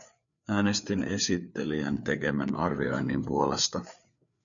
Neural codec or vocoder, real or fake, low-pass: codec, 16 kHz, 4 kbps, FreqCodec, larger model; fake; 7.2 kHz